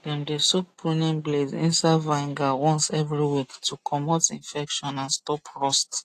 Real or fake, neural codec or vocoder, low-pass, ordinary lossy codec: real; none; 14.4 kHz; AAC, 48 kbps